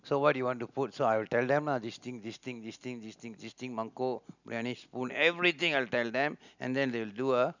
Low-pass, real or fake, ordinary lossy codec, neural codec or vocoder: 7.2 kHz; real; none; none